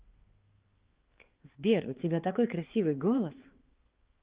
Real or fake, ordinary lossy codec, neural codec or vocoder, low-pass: fake; Opus, 16 kbps; codec, 16 kHz, 4 kbps, X-Codec, HuBERT features, trained on balanced general audio; 3.6 kHz